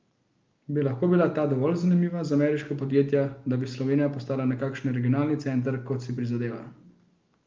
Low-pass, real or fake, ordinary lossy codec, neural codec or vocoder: 7.2 kHz; real; Opus, 32 kbps; none